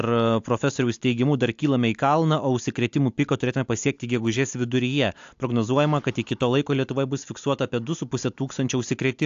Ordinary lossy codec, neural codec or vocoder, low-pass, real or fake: AAC, 64 kbps; none; 7.2 kHz; real